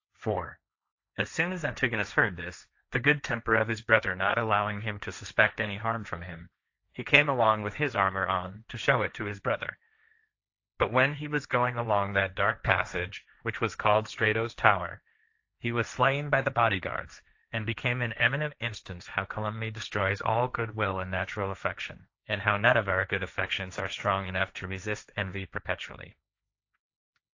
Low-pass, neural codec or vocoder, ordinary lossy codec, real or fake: 7.2 kHz; codec, 16 kHz, 1.1 kbps, Voila-Tokenizer; AAC, 48 kbps; fake